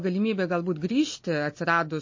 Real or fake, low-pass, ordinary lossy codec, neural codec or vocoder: real; 7.2 kHz; MP3, 32 kbps; none